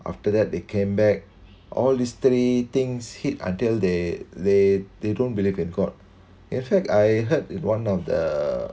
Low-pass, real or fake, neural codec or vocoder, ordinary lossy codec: none; real; none; none